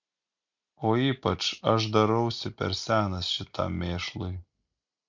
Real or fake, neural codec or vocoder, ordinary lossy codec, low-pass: real; none; MP3, 64 kbps; 7.2 kHz